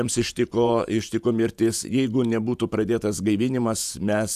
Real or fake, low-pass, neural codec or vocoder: fake; 14.4 kHz; vocoder, 48 kHz, 128 mel bands, Vocos